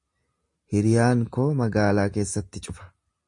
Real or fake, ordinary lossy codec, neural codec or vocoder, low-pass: real; MP3, 48 kbps; none; 10.8 kHz